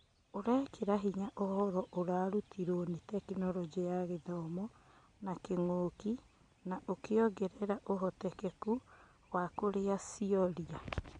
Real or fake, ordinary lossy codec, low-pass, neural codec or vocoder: real; MP3, 64 kbps; 9.9 kHz; none